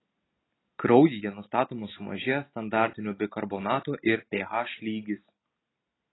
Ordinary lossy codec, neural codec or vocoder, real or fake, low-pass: AAC, 16 kbps; none; real; 7.2 kHz